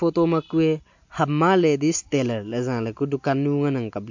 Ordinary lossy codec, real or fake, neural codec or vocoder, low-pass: MP3, 48 kbps; real; none; 7.2 kHz